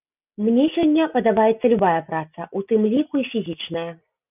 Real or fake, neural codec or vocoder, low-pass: real; none; 3.6 kHz